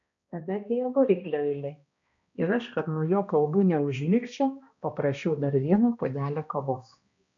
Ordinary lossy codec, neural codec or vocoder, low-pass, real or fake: MP3, 64 kbps; codec, 16 kHz, 1 kbps, X-Codec, HuBERT features, trained on balanced general audio; 7.2 kHz; fake